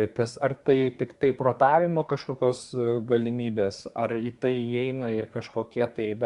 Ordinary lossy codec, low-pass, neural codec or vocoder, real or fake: Opus, 64 kbps; 10.8 kHz; codec, 24 kHz, 1 kbps, SNAC; fake